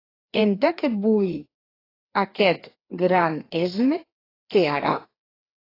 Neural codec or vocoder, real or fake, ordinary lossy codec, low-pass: codec, 16 kHz, 2 kbps, FreqCodec, larger model; fake; AAC, 24 kbps; 5.4 kHz